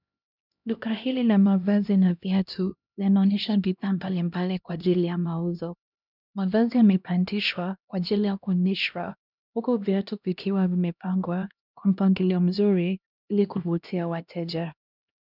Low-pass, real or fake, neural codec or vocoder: 5.4 kHz; fake; codec, 16 kHz, 1 kbps, X-Codec, HuBERT features, trained on LibriSpeech